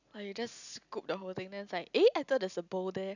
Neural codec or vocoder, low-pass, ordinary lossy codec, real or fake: none; 7.2 kHz; none; real